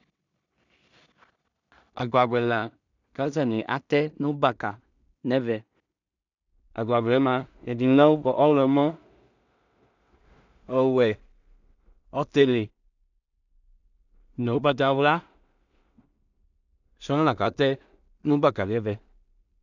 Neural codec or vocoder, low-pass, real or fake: codec, 16 kHz in and 24 kHz out, 0.4 kbps, LongCat-Audio-Codec, two codebook decoder; 7.2 kHz; fake